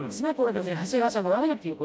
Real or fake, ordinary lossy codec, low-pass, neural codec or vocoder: fake; none; none; codec, 16 kHz, 0.5 kbps, FreqCodec, smaller model